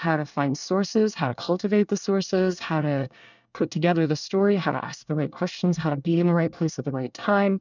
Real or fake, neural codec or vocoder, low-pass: fake; codec, 24 kHz, 1 kbps, SNAC; 7.2 kHz